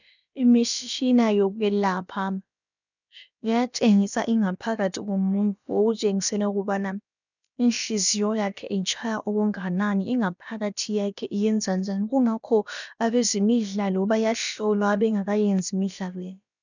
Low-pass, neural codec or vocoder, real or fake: 7.2 kHz; codec, 16 kHz, about 1 kbps, DyCAST, with the encoder's durations; fake